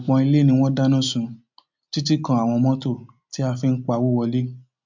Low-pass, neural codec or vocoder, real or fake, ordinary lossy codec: 7.2 kHz; none; real; MP3, 64 kbps